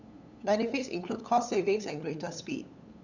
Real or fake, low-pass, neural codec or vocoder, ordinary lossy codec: fake; 7.2 kHz; codec, 16 kHz, 8 kbps, FunCodec, trained on LibriTTS, 25 frames a second; none